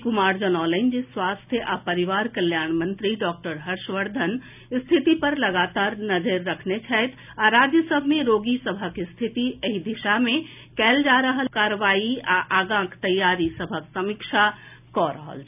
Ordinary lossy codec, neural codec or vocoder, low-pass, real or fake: none; none; 3.6 kHz; real